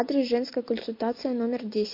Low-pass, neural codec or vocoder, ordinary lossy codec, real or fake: 5.4 kHz; none; MP3, 32 kbps; real